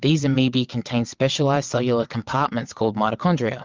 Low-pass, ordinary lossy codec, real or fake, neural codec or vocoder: 7.2 kHz; Opus, 32 kbps; fake; vocoder, 22.05 kHz, 80 mel bands, WaveNeXt